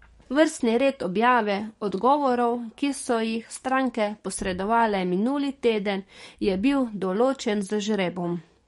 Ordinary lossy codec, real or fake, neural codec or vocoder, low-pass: MP3, 48 kbps; fake; codec, 44.1 kHz, 7.8 kbps, DAC; 19.8 kHz